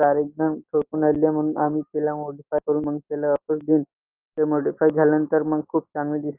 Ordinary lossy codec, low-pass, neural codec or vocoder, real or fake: Opus, 24 kbps; 3.6 kHz; none; real